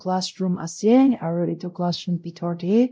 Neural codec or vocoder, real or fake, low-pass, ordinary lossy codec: codec, 16 kHz, 0.5 kbps, X-Codec, WavLM features, trained on Multilingual LibriSpeech; fake; none; none